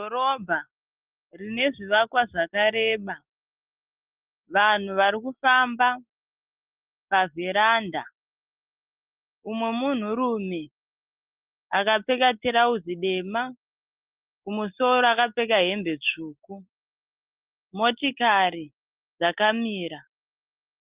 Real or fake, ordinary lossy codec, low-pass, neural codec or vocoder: real; Opus, 24 kbps; 3.6 kHz; none